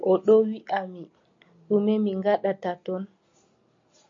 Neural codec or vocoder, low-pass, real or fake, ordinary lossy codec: none; 7.2 kHz; real; MP3, 48 kbps